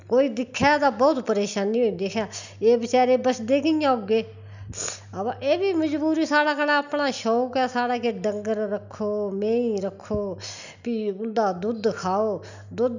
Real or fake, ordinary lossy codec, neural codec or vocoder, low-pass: real; none; none; 7.2 kHz